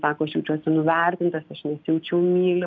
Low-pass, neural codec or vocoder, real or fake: 7.2 kHz; none; real